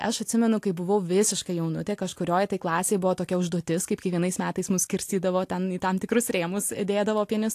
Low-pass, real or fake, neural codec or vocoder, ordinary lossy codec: 14.4 kHz; real; none; AAC, 64 kbps